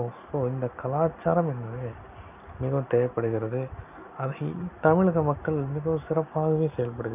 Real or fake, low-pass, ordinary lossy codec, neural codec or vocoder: real; 3.6 kHz; none; none